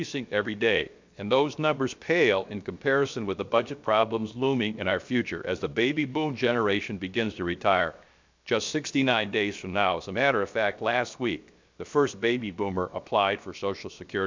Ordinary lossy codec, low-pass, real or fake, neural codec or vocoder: MP3, 64 kbps; 7.2 kHz; fake; codec, 16 kHz, 0.7 kbps, FocalCodec